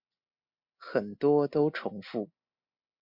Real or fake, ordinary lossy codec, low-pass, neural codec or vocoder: real; MP3, 48 kbps; 5.4 kHz; none